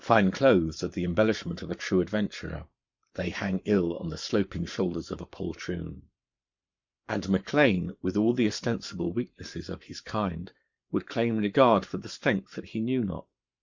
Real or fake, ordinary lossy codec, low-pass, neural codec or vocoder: fake; Opus, 64 kbps; 7.2 kHz; codec, 44.1 kHz, 7.8 kbps, Pupu-Codec